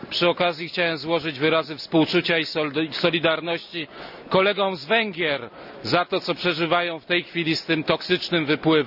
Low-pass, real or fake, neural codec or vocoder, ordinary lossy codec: 5.4 kHz; real; none; AAC, 48 kbps